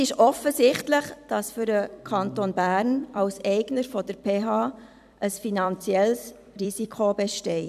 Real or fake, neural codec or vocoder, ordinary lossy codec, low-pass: fake; vocoder, 44.1 kHz, 128 mel bands every 256 samples, BigVGAN v2; none; 14.4 kHz